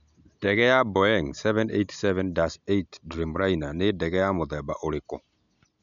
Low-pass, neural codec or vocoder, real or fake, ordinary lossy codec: 7.2 kHz; none; real; MP3, 96 kbps